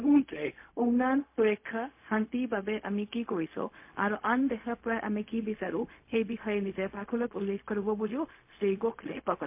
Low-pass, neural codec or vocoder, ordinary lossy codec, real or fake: 3.6 kHz; codec, 16 kHz, 0.4 kbps, LongCat-Audio-Codec; MP3, 24 kbps; fake